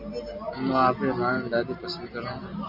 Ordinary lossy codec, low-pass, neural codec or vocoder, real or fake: MP3, 48 kbps; 5.4 kHz; none; real